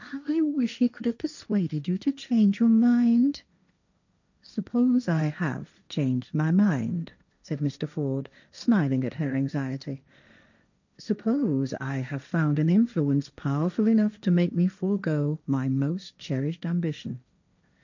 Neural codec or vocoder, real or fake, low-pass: codec, 16 kHz, 1.1 kbps, Voila-Tokenizer; fake; 7.2 kHz